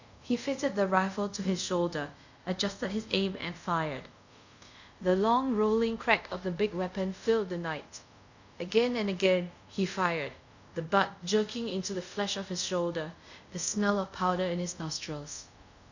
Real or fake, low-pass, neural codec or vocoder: fake; 7.2 kHz; codec, 24 kHz, 0.5 kbps, DualCodec